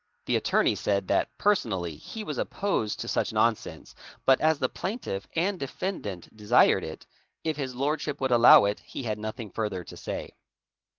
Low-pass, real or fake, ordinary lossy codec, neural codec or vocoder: 7.2 kHz; real; Opus, 16 kbps; none